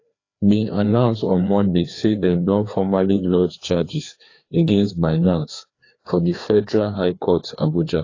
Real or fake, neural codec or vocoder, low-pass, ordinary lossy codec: fake; codec, 16 kHz, 2 kbps, FreqCodec, larger model; 7.2 kHz; AAC, 32 kbps